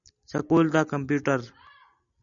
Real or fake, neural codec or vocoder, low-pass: real; none; 7.2 kHz